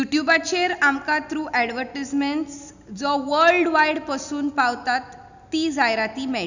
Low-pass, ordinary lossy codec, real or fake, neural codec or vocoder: 7.2 kHz; none; real; none